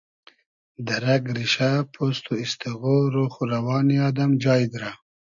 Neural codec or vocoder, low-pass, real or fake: none; 7.2 kHz; real